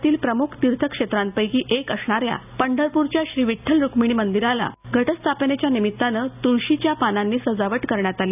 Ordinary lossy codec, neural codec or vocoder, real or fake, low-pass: none; none; real; 3.6 kHz